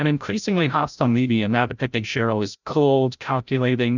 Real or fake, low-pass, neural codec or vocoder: fake; 7.2 kHz; codec, 16 kHz, 0.5 kbps, FreqCodec, larger model